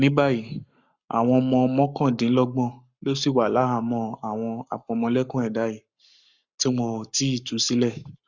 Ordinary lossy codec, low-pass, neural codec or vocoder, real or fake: Opus, 64 kbps; 7.2 kHz; codec, 44.1 kHz, 7.8 kbps, Pupu-Codec; fake